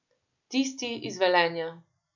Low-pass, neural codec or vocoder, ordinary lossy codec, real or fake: 7.2 kHz; none; none; real